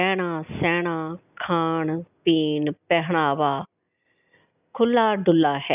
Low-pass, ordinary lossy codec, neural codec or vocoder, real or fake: 3.6 kHz; none; none; real